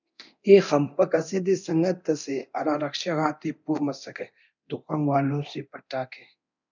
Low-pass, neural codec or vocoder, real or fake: 7.2 kHz; codec, 24 kHz, 0.9 kbps, DualCodec; fake